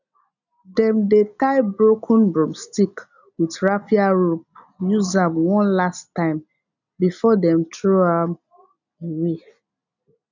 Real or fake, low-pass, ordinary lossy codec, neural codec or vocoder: real; 7.2 kHz; none; none